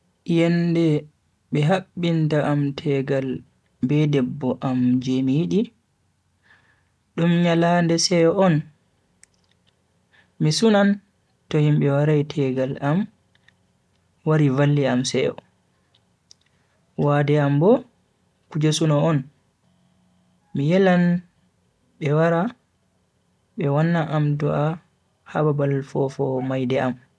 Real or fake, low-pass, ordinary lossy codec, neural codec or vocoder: real; none; none; none